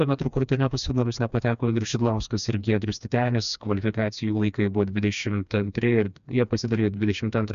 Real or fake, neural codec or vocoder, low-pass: fake; codec, 16 kHz, 2 kbps, FreqCodec, smaller model; 7.2 kHz